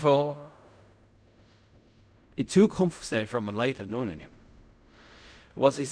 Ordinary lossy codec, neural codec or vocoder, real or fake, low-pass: none; codec, 16 kHz in and 24 kHz out, 0.4 kbps, LongCat-Audio-Codec, fine tuned four codebook decoder; fake; 9.9 kHz